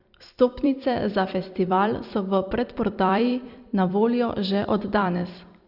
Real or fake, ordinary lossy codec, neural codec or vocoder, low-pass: fake; Opus, 64 kbps; vocoder, 44.1 kHz, 128 mel bands every 256 samples, BigVGAN v2; 5.4 kHz